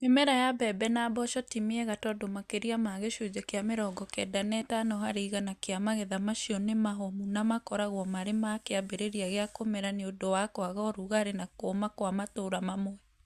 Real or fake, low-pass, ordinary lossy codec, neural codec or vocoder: real; 14.4 kHz; Opus, 64 kbps; none